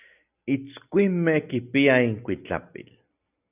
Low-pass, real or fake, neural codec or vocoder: 3.6 kHz; real; none